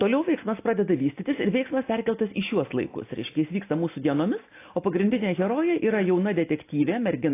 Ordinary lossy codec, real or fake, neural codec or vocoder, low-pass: AAC, 24 kbps; real; none; 3.6 kHz